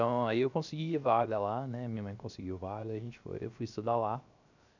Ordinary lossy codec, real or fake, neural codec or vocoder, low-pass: none; fake; codec, 16 kHz, 0.7 kbps, FocalCodec; 7.2 kHz